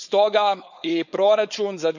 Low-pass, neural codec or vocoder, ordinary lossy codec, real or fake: 7.2 kHz; codec, 16 kHz, 4.8 kbps, FACodec; none; fake